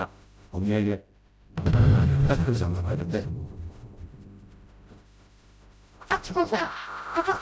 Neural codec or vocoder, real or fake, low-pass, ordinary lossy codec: codec, 16 kHz, 0.5 kbps, FreqCodec, smaller model; fake; none; none